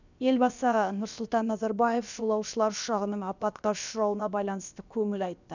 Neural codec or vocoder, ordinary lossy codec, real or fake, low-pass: codec, 16 kHz, about 1 kbps, DyCAST, with the encoder's durations; none; fake; 7.2 kHz